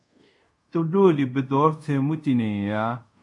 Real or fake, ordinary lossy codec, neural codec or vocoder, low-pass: fake; MP3, 64 kbps; codec, 24 kHz, 0.5 kbps, DualCodec; 10.8 kHz